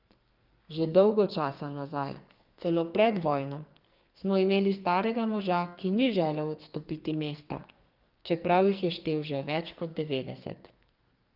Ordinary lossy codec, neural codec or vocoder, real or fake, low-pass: Opus, 32 kbps; codec, 44.1 kHz, 2.6 kbps, SNAC; fake; 5.4 kHz